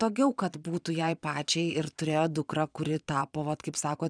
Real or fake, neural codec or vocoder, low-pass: fake; vocoder, 22.05 kHz, 80 mel bands, Vocos; 9.9 kHz